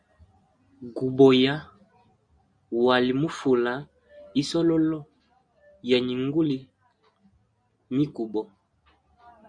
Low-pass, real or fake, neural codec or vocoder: 9.9 kHz; real; none